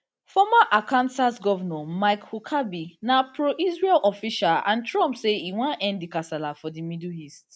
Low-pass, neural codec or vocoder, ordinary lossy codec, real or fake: none; none; none; real